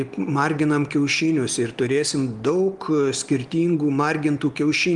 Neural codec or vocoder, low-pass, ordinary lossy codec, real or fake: none; 10.8 kHz; Opus, 24 kbps; real